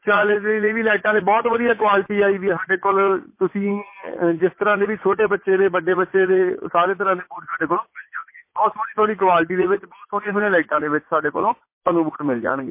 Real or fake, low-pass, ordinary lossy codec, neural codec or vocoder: fake; 3.6 kHz; MP3, 24 kbps; vocoder, 44.1 kHz, 80 mel bands, Vocos